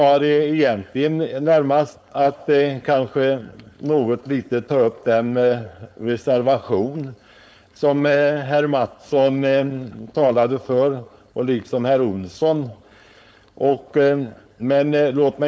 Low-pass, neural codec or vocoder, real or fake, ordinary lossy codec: none; codec, 16 kHz, 4.8 kbps, FACodec; fake; none